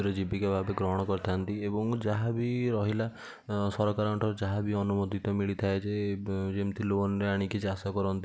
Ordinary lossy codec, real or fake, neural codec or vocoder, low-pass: none; real; none; none